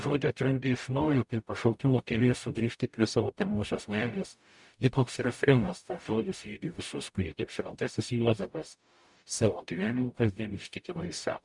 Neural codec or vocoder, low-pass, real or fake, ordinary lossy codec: codec, 44.1 kHz, 0.9 kbps, DAC; 10.8 kHz; fake; MP3, 96 kbps